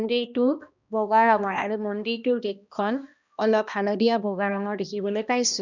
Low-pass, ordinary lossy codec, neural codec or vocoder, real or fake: 7.2 kHz; none; codec, 16 kHz, 1 kbps, X-Codec, HuBERT features, trained on balanced general audio; fake